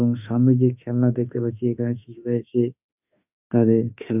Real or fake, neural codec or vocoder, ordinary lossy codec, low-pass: fake; autoencoder, 48 kHz, 32 numbers a frame, DAC-VAE, trained on Japanese speech; none; 3.6 kHz